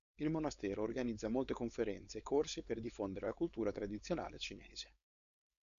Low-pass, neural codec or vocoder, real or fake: 7.2 kHz; codec, 16 kHz, 4.8 kbps, FACodec; fake